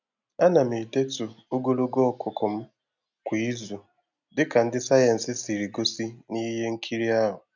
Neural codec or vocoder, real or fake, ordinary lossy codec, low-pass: none; real; none; 7.2 kHz